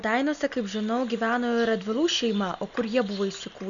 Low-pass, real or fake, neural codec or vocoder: 7.2 kHz; real; none